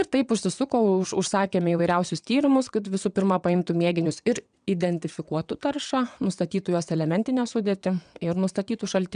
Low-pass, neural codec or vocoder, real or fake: 9.9 kHz; vocoder, 22.05 kHz, 80 mel bands, WaveNeXt; fake